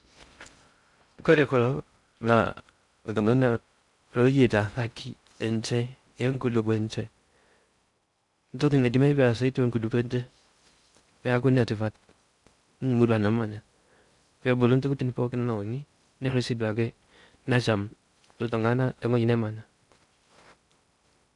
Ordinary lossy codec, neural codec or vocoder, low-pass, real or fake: none; codec, 16 kHz in and 24 kHz out, 0.6 kbps, FocalCodec, streaming, 2048 codes; 10.8 kHz; fake